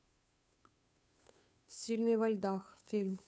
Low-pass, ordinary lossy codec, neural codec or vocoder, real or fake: none; none; codec, 16 kHz, 2 kbps, FunCodec, trained on Chinese and English, 25 frames a second; fake